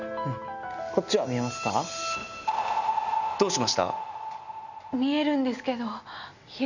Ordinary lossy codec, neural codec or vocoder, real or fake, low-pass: none; none; real; 7.2 kHz